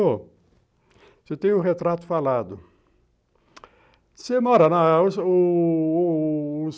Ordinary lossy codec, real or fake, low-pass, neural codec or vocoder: none; real; none; none